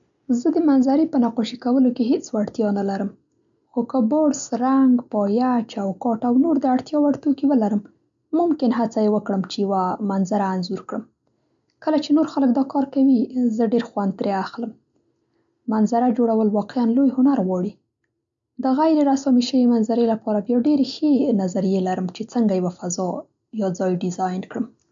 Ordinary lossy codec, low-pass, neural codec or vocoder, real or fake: MP3, 64 kbps; 7.2 kHz; none; real